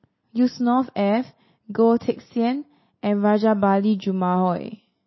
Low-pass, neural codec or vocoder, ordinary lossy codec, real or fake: 7.2 kHz; none; MP3, 24 kbps; real